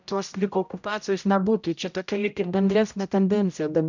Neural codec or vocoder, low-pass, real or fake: codec, 16 kHz, 0.5 kbps, X-Codec, HuBERT features, trained on general audio; 7.2 kHz; fake